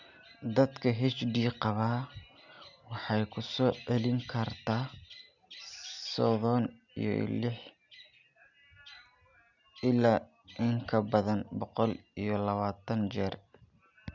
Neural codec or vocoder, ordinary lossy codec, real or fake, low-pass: none; none; real; 7.2 kHz